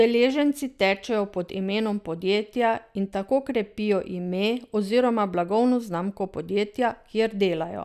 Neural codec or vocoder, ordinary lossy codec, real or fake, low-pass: none; none; real; 14.4 kHz